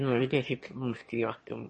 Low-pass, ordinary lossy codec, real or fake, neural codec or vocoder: 9.9 kHz; MP3, 32 kbps; fake; autoencoder, 22.05 kHz, a latent of 192 numbers a frame, VITS, trained on one speaker